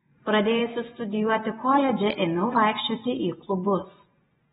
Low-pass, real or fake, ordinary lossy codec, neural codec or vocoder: 10.8 kHz; fake; AAC, 16 kbps; vocoder, 24 kHz, 100 mel bands, Vocos